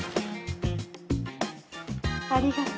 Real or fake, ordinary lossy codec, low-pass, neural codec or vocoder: real; none; none; none